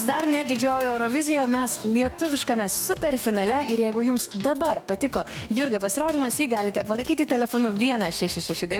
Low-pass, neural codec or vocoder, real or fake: 19.8 kHz; codec, 44.1 kHz, 2.6 kbps, DAC; fake